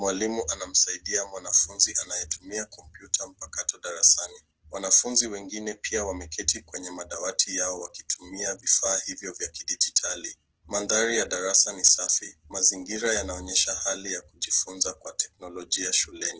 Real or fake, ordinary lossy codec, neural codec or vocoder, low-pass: real; Opus, 16 kbps; none; 7.2 kHz